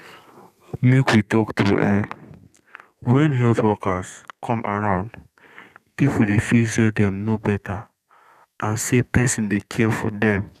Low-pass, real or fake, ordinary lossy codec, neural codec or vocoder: 14.4 kHz; fake; none; codec, 32 kHz, 1.9 kbps, SNAC